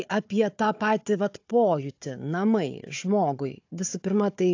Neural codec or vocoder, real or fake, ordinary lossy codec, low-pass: codec, 16 kHz, 4 kbps, FunCodec, trained on Chinese and English, 50 frames a second; fake; AAC, 48 kbps; 7.2 kHz